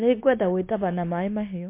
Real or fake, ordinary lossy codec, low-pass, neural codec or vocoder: fake; MP3, 24 kbps; 3.6 kHz; codec, 16 kHz, 0.9 kbps, LongCat-Audio-Codec